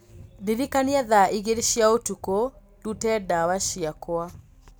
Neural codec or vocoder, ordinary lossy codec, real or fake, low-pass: none; none; real; none